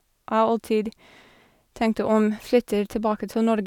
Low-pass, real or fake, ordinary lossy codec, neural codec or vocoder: 19.8 kHz; real; none; none